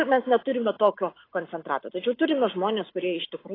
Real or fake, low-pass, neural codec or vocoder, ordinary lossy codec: real; 5.4 kHz; none; AAC, 24 kbps